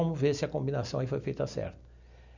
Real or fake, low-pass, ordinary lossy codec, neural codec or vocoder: real; 7.2 kHz; none; none